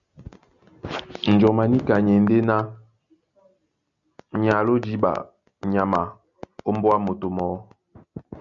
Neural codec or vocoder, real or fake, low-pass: none; real; 7.2 kHz